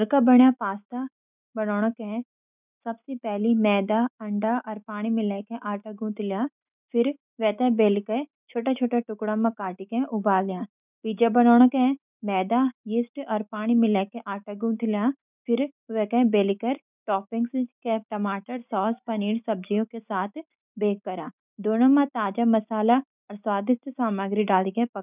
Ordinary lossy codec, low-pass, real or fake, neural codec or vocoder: none; 3.6 kHz; real; none